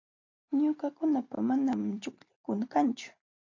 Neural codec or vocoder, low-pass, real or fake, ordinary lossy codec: none; 7.2 kHz; real; AAC, 48 kbps